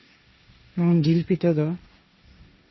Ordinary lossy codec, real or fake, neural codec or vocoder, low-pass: MP3, 24 kbps; fake; codec, 16 kHz, 1.1 kbps, Voila-Tokenizer; 7.2 kHz